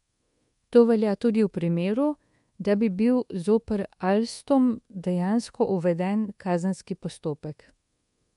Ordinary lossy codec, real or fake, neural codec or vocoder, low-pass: MP3, 64 kbps; fake; codec, 24 kHz, 1.2 kbps, DualCodec; 10.8 kHz